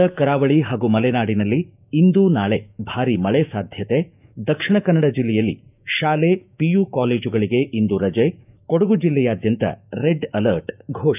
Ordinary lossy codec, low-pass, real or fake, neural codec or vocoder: none; 3.6 kHz; fake; autoencoder, 48 kHz, 128 numbers a frame, DAC-VAE, trained on Japanese speech